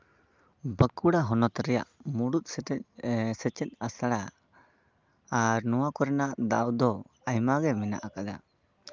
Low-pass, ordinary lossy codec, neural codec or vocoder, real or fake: 7.2 kHz; Opus, 32 kbps; none; real